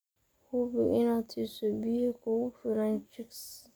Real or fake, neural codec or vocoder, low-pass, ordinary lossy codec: real; none; none; none